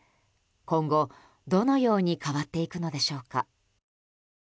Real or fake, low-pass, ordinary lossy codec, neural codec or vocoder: real; none; none; none